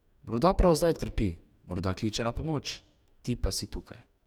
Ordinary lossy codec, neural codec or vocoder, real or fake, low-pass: none; codec, 44.1 kHz, 2.6 kbps, DAC; fake; 19.8 kHz